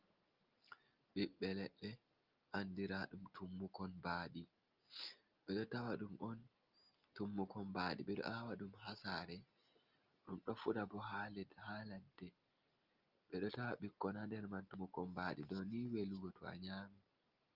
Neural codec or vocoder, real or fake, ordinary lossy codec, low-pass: none; real; Opus, 24 kbps; 5.4 kHz